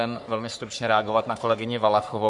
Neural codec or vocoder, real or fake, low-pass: codec, 44.1 kHz, 3.4 kbps, Pupu-Codec; fake; 10.8 kHz